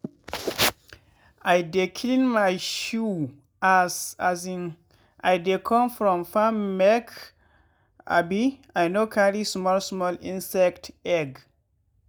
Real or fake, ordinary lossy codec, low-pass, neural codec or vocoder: real; none; none; none